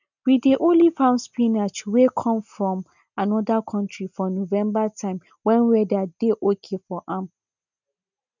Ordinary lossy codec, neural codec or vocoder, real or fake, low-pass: none; none; real; 7.2 kHz